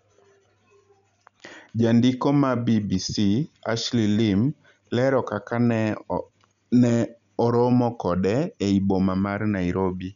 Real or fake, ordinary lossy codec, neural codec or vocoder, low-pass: real; none; none; 7.2 kHz